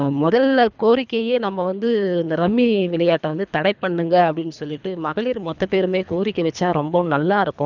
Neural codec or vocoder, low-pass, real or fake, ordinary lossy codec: codec, 24 kHz, 3 kbps, HILCodec; 7.2 kHz; fake; none